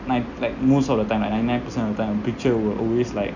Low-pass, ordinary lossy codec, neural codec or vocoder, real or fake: 7.2 kHz; none; none; real